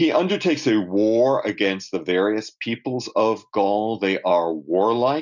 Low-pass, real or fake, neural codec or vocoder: 7.2 kHz; real; none